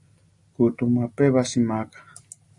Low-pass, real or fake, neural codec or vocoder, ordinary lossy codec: 10.8 kHz; real; none; AAC, 64 kbps